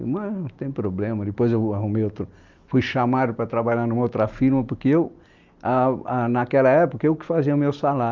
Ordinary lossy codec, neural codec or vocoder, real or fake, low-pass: Opus, 24 kbps; none; real; 7.2 kHz